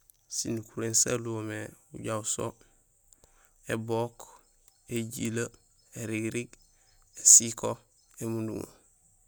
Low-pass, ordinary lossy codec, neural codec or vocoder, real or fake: none; none; none; real